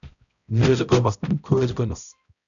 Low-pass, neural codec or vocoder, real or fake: 7.2 kHz; codec, 16 kHz, 0.5 kbps, X-Codec, HuBERT features, trained on balanced general audio; fake